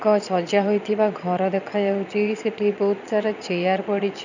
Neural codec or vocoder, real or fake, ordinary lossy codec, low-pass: vocoder, 22.05 kHz, 80 mel bands, Vocos; fake; none; 7.2 kHz